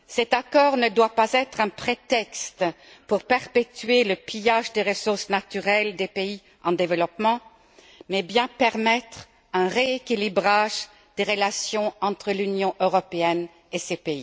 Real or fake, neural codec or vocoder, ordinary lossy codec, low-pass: real; none; none; none